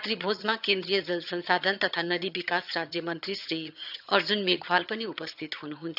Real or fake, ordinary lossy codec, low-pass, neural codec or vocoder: fake; none; 5.4 kHz; vocoder, 22.05 kHz, 80 mel bands, HiFi-GAN